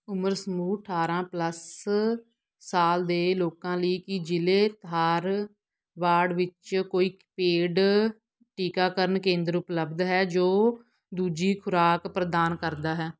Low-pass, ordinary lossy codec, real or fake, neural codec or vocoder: none; none; real; none